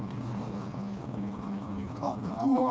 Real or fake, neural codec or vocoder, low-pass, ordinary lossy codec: fake; codec, 16 kHz, 2 kbps, FreqCodec, smaller model; none; none